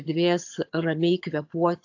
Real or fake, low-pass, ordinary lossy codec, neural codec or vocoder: fake; 7.2 kHz; AAC, 48 kbps; vocoder, 22.05 kHz, 80 mel bands, HiFi-GAN